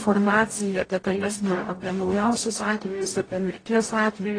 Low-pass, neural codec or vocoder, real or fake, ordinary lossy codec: 9.9 kHz; codec, 44.1 kHz, 0.9 kbps, DAC; fake; AAC, 32 kbps